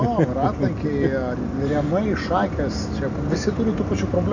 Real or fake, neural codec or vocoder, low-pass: real; none; 7.2 kHz